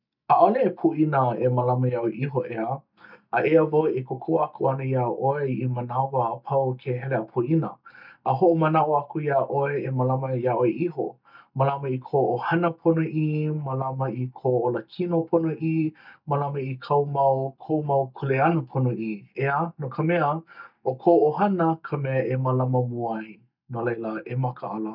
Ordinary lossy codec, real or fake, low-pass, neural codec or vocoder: none; real; 5.4 kHz; none